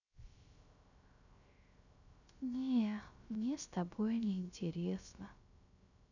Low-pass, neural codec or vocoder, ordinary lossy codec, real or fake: 7.2 kHz; codec, 16 kHz, 0.3 kbps, FocalCodec; none; fake